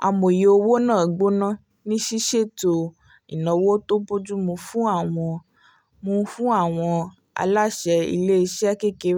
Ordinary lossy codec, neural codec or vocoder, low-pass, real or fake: none; none; none; real